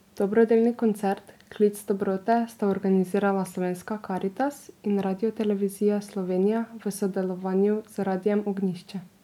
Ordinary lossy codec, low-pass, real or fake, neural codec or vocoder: MP3, 96 kbps; 19.8 kHz; real; none